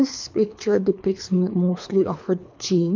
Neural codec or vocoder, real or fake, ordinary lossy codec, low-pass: codec, 24 kHz, 3 kbps, HILCodec; fake; MP3, 64 kbps; 7.2 kHz